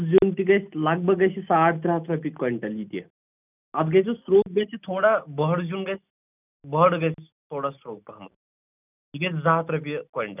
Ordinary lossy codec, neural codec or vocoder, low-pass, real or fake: none; none; 3.6 kHz; real